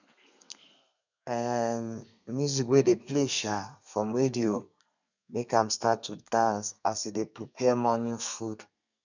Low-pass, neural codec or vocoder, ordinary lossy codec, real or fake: 7.2 kHz; codec, 32 kHz, 1.9 kbps, SNAC; none; fake